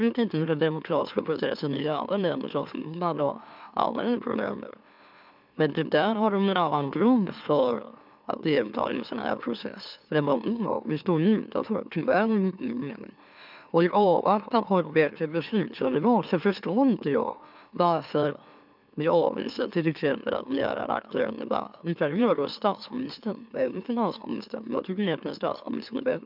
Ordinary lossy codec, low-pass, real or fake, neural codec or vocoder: none; 5.4 kHz; fake; autoencoder, 44.1 kHz, a latent of 192 numbers a frame, MeloTTS